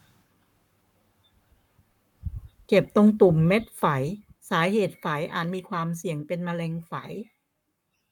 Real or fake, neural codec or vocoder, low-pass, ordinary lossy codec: fake; codec, 44.1 kHz, 7.8 kbps, Pupu-Codec; 19.8 kHz; none